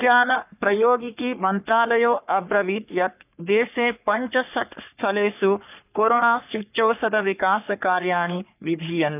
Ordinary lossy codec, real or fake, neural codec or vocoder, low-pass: none; fake; codec, 44.1 kHz, 3.4 kbps, Pupu-Codec; 3.6 kHz